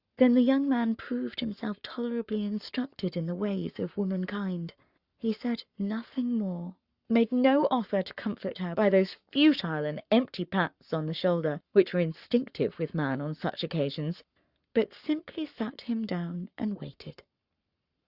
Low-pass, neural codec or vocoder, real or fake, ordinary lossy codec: 5.4 kHz; codec, 44.1 kHz, 7.8 kbps, Pupu-Codec; fake; Opus, 64 kbps